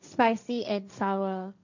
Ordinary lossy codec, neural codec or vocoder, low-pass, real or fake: none; codec, 16 kHz, 1.1 kbps, Voila-Tokenizer; 7.2 kHz; fake